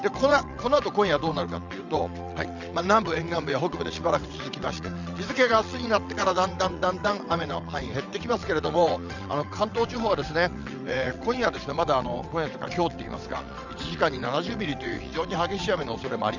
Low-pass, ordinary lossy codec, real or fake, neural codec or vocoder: 7.2 kHz; none; fake; vocoder, 22.05 kHz, 80 mel bands, WaveNeXt